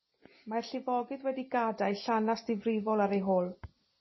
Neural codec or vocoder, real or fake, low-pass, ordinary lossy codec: none; real; 7.2 kHz; MP3, 24 kbps